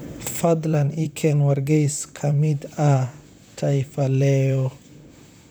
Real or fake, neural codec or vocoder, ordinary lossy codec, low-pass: fake; vocoder, 44.1 kHz, 128 mel bands, Pupu-Vocoder; none; none